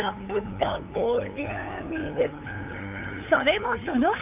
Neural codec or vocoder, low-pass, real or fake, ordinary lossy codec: codec, 16 kHz, 8 kbps, FunCodec, trained on LibriTTS, 25 frames a second; 3.6 kHz; fake; none